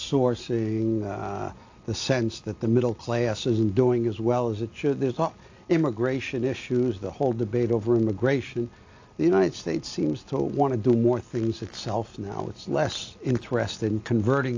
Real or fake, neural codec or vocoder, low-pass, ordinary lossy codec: real; none; 7.2 kHz; AAC, 48 kbps